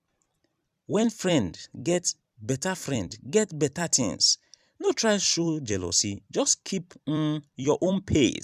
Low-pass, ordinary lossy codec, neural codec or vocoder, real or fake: 14.4 kHz; none; vocoder, 44.1 kHz, 128 mel bands every 256 samples, BigVGAN v2; fake